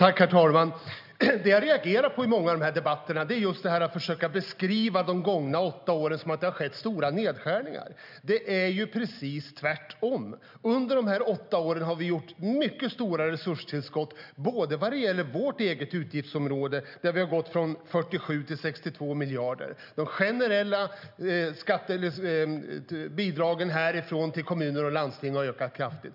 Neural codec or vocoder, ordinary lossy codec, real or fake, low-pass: none; none; real; 5.4 kHz